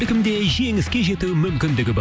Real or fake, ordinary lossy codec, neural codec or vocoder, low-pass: real; none; none; none